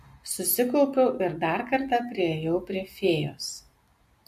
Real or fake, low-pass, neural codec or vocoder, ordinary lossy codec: real; 14.4 kHz; none; MP3, 64 kbps